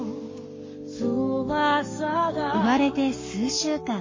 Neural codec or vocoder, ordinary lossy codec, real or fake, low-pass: codec, 44.1 kHz, 7.8 kbps, Pupu-Codec; MP3, 32 kbps; fake; 7.2 kHz